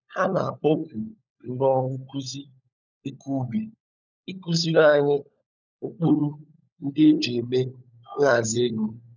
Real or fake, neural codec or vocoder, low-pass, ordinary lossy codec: fake; codec, 16 kHz, 16 kbps, FunCodec, trained on LibriTTS, 50 frames a second; 7.2 kHz; none